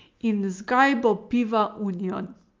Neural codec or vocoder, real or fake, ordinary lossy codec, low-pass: none; real; Opus, 32 kbps; 7.2 kHz